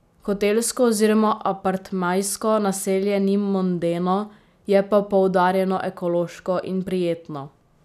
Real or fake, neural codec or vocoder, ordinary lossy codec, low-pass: real; none; none; 14.4 kHz